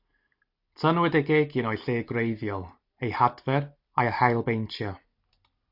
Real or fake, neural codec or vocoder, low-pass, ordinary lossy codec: real; none; 5.4 kHz; AAC, 48 kbps